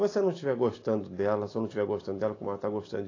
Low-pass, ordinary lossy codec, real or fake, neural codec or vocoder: 7.2 kHz; AAC, 32 kbps; real; none